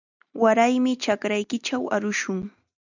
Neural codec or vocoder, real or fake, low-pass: none; real; 7.2 kHz